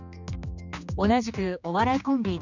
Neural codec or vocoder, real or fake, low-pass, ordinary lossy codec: codec, 16 kHz, 2 kbps, X-Codec, HuBERT features, trained on general audio; fake; 7.2 kHz; none